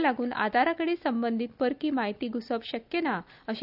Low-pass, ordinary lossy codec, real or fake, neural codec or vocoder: 5.4 kHz; none; real; none